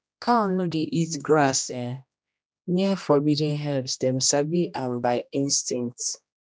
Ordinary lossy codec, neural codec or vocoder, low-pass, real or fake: none; codec, 16 kHz, 1 kbps, X-Codec, HuBERT features, trained on general audio; none; fake